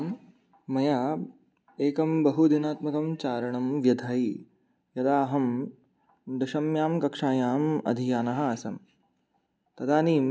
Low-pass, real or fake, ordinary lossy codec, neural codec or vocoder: none; real; none; none